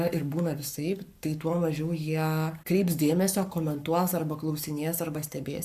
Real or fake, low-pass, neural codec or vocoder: fake; 14.4 kHz; codec, 44.1 kHz, 7.8 kbps, Pupu-Codec